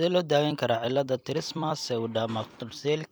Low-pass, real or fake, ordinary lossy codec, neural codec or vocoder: none; fake; none; vocoder, 44.1 kHz, 128 mel bands every 256 samples, BigVGAN v2